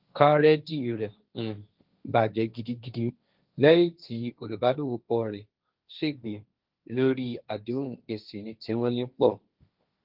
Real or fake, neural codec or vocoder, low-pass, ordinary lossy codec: fake; codec, 16 kHz, 1.1 kbps, Voila-Tokenizer; 5.4 kHz; Opus, 24 kbps